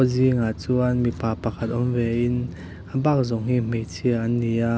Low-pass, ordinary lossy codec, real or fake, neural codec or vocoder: none; none; real; none